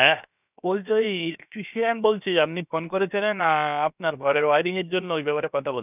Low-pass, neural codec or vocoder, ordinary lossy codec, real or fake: 3.6 kHz; codec, 16 kHz, 0.7 kbps, FocalCodec; none; fake